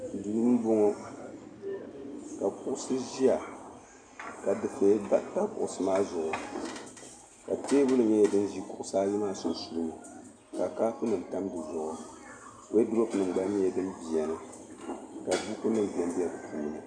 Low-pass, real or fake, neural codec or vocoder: 9.9 kHz; real; none